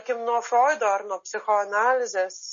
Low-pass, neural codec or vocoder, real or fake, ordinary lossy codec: 7.2 kHz; none; real; MP3, 32 kbps